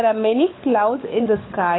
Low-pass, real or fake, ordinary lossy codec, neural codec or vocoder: 7.2 kHz; fake; AAC, 16 kbps; codec, 16 kHz, 16 kbps, FunCodec, trained on LibriTTS, 50 frames a second